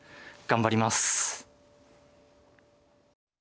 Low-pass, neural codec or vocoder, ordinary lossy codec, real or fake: none; none; none; real